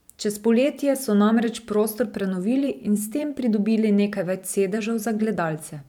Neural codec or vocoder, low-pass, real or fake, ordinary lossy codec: vocoder, 44.1 kHz, 128 mel bands every 512 samples, BigVGAN v2; 19.8 kHz; fake; none